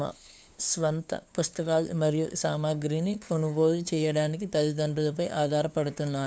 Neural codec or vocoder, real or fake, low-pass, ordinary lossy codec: codec, 16 kHz, 2 kbps, FunCodec, trained on LibriTTS, 25 frames a second; fake; none; none